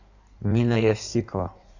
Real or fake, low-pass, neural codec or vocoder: fake; 7.2 kHz; codec, 16 kHz in and 24 kHz out, 1.1 kbps, FireRedTTS-2 codec